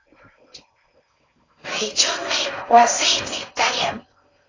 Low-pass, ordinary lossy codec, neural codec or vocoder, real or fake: 7.2 kHz; AAC, 32 kbps; codec, 16 kHz in and 24 kHz out, 0.6 kbps, FocalCodec, streaming, 4096 codes; fake